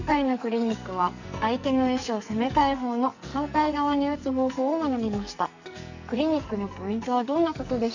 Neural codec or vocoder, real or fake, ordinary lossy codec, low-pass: codec, 44.1 kHz, 2.6 kbps, SNAC; fake; none; 7.2 kHz